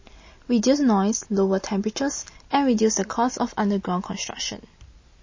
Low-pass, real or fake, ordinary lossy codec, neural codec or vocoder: 7.2 kHz; real; MP3, 32 kbps; none